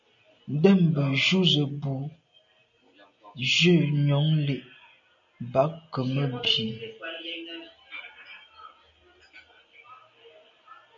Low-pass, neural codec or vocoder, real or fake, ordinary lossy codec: 7.2 kHz; none; real; MP3, 48 kbps